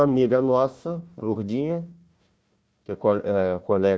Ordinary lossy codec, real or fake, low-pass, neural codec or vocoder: none; fake; none; codec, 16 kHz, 1 kbps, FunCodec, trained on Chinese and English, 50 frames a second